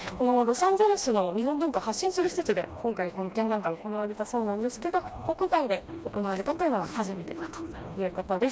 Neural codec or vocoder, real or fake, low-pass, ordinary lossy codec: codec, 16 kHz, 1 kbps, FreqCodec, smaller model; fake; none; none